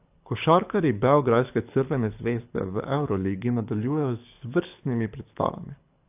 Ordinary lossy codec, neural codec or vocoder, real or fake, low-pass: AAC, 32 kbps; codec, 24 kHz, 6 kbps, HILCodec; fake; 3.6 kHz